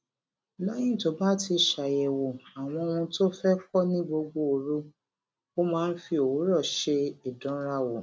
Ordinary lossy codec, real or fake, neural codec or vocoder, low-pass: none; real; none; none